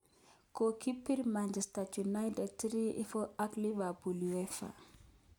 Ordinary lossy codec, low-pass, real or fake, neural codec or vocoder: none; none; real; none